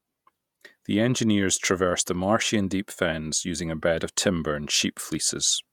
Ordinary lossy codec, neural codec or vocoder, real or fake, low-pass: none; vocoder, 48 kHz, 128 mel bands, Vocos; fake; 19.8 kHz